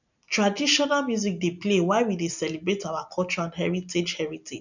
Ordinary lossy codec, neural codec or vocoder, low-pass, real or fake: none; none; 7.2 kHz; real